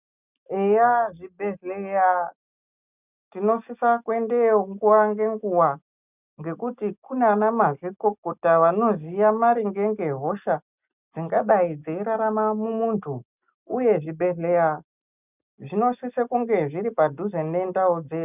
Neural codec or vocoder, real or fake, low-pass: none; real; 3.6 kHz